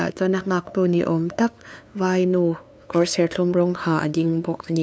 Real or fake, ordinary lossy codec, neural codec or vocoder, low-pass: fake; none; codec, 16 kHz, 2 kbps, FunCodec, trained on LibriTTS, 25 frames a second; none